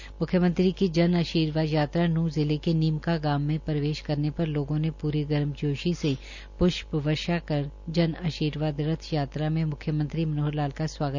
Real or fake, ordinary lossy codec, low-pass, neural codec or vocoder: real; none; 7.2 kHz; none